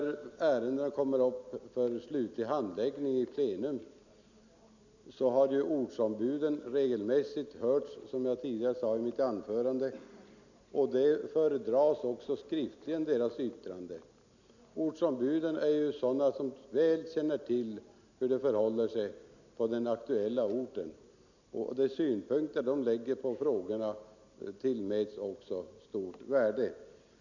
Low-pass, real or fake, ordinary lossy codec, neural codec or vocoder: 7.2 kHz; real; none; none